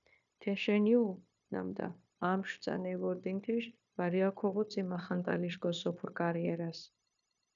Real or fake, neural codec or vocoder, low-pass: fake; codec, 16 kHz, 0.9 kbps, LongCat-Audio-Codec; 7.2 kHz